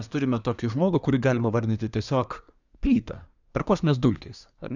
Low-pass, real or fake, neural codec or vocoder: 7.2 kHz; fake; codec, 24 kHz, 1 kbps, SNAC